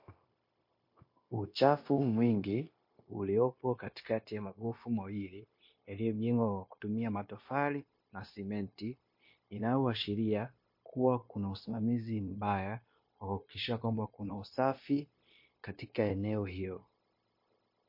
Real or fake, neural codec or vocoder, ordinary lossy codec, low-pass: fake; codec, 16 kHz, 0.9 kbps, LongCat-Audio-Codec; MP3, 32 kbps; 5.4 kHz